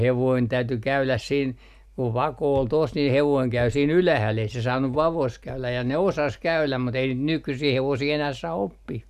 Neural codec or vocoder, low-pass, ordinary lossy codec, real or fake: none; 14.4 kHz; none; real